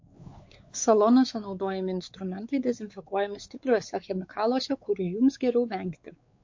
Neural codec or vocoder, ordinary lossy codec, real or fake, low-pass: codec, 16 kHz, 4 kbps, X-Codec, WavLM features, trained on Multilingual LibriSpeech; MP3, 48 kbps; fake; 7.2 kHz